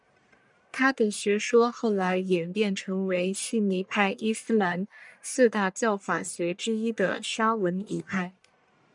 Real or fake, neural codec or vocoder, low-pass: fake; codec, 44.1 kHz, 1.7 kbps, Pupu-Codec; 10.8 kHz